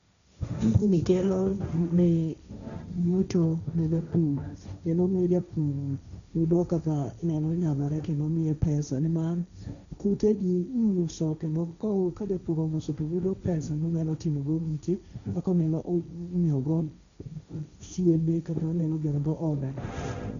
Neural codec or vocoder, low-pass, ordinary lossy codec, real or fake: codec, 16 kHz, 1.1 kbps, Voila-Tokenizer; 7.2 kHz; none; fake